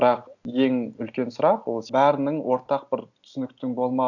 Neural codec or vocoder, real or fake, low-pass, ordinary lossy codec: none; real; 7.2 kHz; none